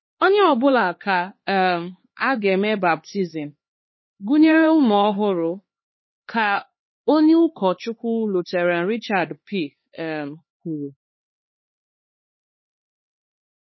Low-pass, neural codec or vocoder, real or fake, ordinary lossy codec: 7.2 kHz; codec, 16 kHz, 4 kbps, X-Codec, HuBERT features, trained on LibriSpeech; fake; MP3, 24 kbps